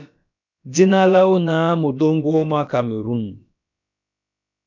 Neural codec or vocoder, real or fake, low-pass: codec, 16 kHz, about 1 kbps, DyCAST, with the encoder's durations; fake; 7.2 kHz